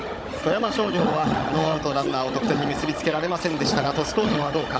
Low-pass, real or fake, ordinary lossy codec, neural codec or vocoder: none; fake; none; codec, 16 kHz, 16 kbps, FunCodec, trained on Chinese and English, 50 frames a second